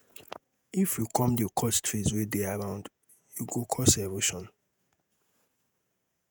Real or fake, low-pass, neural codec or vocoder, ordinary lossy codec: fake; none; vocoder, 48 kHz, 128 mel bands, Vocos; none